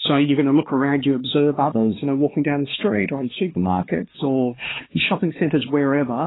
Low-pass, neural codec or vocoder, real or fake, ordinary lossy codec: 7.2 kHz; codec, 16 kHz, 2 kbps, X-Codec, HuBERT features, trained on LibriSpeech; fake; AAC, 16 kbps